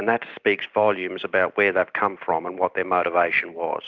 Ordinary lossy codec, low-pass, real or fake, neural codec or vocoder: Opus, 24 kbps; 7.2 kHz; real; none